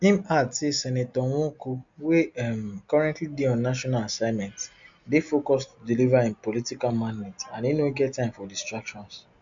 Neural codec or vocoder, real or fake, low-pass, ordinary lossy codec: none; real; 7.2 kHz; MP3, 64 kbps